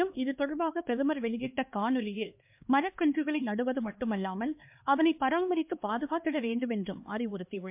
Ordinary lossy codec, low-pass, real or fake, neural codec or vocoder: MP3, 32 kbps; 3.6 kHz; fake; codec, 16 kHz, 2 kbps, X-Codec, HuBERT features, trained on LibriSpeech